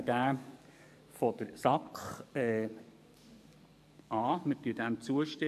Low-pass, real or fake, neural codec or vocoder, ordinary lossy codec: 14.4 kHz; fake; codec, 44.1 kHz, 7.8 kbps, DAC; AAC, 96 kbps